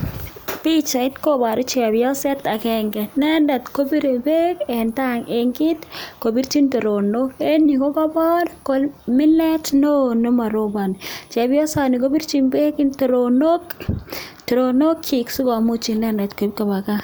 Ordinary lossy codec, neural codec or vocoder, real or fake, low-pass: none; none; real; none